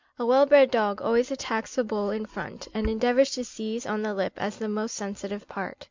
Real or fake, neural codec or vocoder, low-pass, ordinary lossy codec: real; none; 7.2 kHz; MP3, 64 kbps